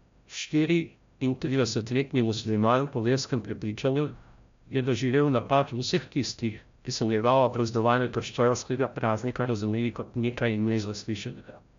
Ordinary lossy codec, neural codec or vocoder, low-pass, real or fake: MP3, 64 kbps; codec, 16 kHz, 0.5 kbps, FreqCodec, larger model; 7.2 kHz; fake